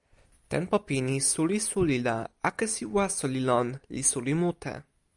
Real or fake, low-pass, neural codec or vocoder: real; 10.8 kHz; none